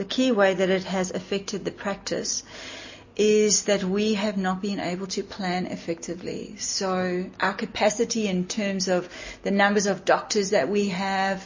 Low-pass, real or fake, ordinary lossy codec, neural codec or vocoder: 7.2 kHz; real; MP3, 32 kbps; none